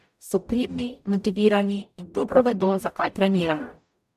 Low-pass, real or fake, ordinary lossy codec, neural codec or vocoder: 14.4 kHz; fake; MP3, 96 kbps; codec, 44.1 kHz, 0.9 kbps, DAC